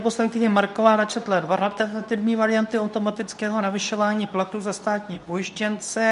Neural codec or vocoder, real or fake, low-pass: codec, 24 kHz, 0.9 kbps, WavTokenizer, medium speech release version 2; fake; 10.8 kHz